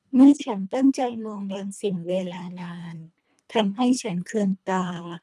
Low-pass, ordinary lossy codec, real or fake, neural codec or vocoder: none; none; fake; codec, 24 kHz, 1.5 kbps, HILCodec